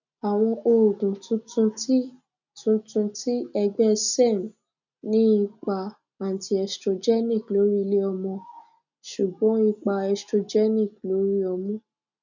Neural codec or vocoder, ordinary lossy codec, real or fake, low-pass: none; none; real; 7.2 kHz